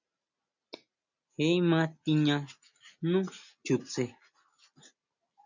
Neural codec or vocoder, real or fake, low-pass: none; real; 7.2 kHz